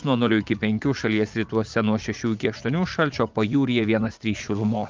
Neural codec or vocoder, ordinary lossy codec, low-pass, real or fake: vocoder, 44.1 kHz, 80 mel bands, Vocos; Opus, 24 kbps; 7.2 kHz; fake